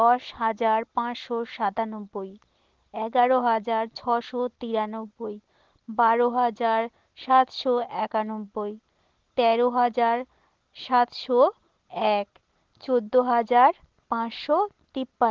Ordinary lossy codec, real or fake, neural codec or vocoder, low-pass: Opus, 16 kbps; real; none; 7.2 kHz